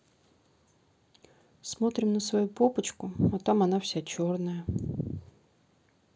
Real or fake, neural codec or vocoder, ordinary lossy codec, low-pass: real; none; none; none